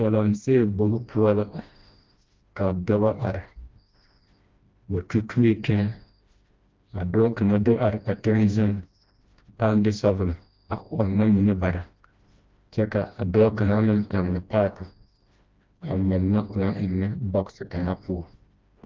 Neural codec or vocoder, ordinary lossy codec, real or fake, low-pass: codec, 16 kHz, 1 kbps, FreqCodec, smaller model; Opus, 24 kbps; fake; 7.2 kHz